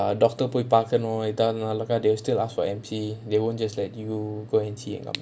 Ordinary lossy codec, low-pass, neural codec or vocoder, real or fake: none; none; none; real